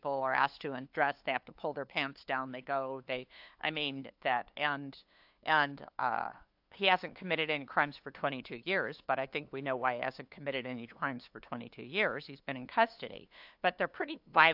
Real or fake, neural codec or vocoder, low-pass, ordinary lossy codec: fake; codec, 16 kHz, 2 kbps, FunCodec, trained on LibriTTS, 25 frames a second; 5.4 kHz; AAC, 48 kbps